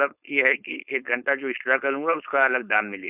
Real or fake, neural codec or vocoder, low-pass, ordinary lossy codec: fake; codec, 16 kHz, 4.8 kbps, FACodec; 3.6 kHz; none